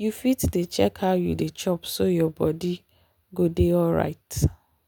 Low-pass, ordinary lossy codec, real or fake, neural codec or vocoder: none; none; real; none